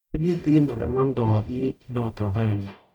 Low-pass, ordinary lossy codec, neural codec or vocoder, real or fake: 19.8 kHz; none; codec, 44.1 kHz, 0.9 kbps, DAC; fake